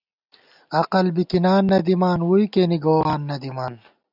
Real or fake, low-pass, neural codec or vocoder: real; 5.4 kHz; none